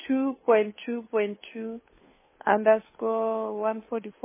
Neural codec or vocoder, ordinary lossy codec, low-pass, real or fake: codec, 16 kHz, 2 kbps, FunCodec, trained on Chinese and English, 25 frames a second; MP3, 16 kbps; 3.6 kHz; fake